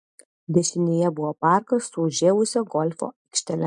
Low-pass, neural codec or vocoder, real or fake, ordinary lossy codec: 10.8 kHz; none; real; MP3, 64 kbps